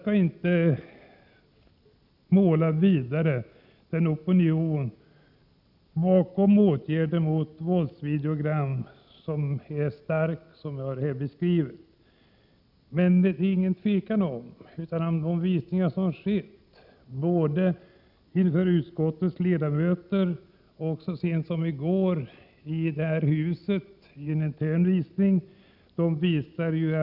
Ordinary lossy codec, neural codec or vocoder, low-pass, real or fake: none; none; 5.4 kHz; real